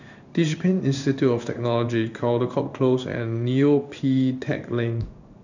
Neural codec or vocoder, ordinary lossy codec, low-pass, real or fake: codec, 16 kHz in and 24 kHz out, 1 kbps, XY-Tokenizer; none; 7.2 kHz; fake